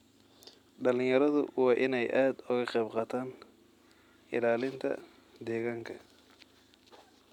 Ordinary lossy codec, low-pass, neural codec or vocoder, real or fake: none; 19.8 kHz; none; real